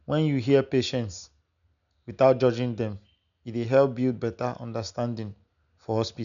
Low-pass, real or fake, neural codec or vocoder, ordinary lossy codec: 7.2 kHz; real; none; none